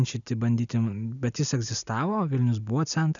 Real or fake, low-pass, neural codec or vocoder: real; 7.2 kHz; none